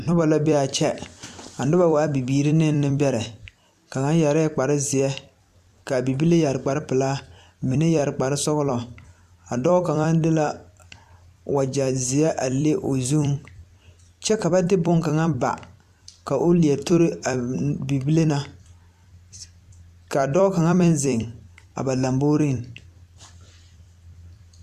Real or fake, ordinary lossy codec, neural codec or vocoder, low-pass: fake; MP3, 96 kbps; vocoder, 44.1 kHz, 128 mel bands every 256 samples, BigVGAN v2; 14.4 kHz